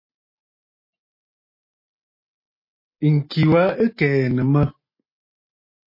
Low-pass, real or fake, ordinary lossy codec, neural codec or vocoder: 5.4 kHz; real; MP3, 24 kbps; none